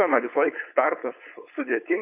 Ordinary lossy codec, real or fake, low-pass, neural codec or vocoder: AAC, 32 kbps; fake; 3.6 kHz; codec, 16 kHz, 4.8 kbps, FACodec